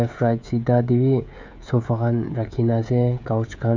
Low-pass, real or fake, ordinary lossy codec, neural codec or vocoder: 7.2 kHz; fake; MP3, 64 kbps; codec, 16 kHz, 16 kbps, FreqCodec, smaller model